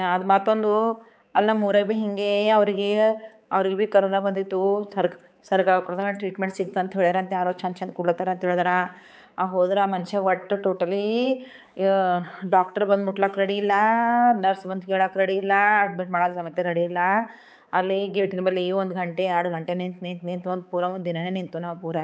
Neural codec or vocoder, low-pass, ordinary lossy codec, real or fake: codec, 16 kHz, 4 kbps, X-Codec, HuBERT features, trained on balanced general audio; none; none; fake